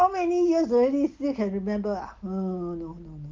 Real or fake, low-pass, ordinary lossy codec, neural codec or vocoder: real; 7.2 kHz; Opus, 32 kbps; none